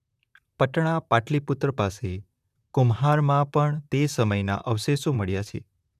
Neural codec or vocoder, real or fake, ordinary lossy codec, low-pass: vocoder, 44.1 kHz, 128 mel bands, Pupu-Vocoder; fake; none; 14.4 kHz